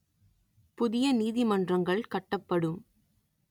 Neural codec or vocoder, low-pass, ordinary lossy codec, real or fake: none; 19.8 kHz; none; real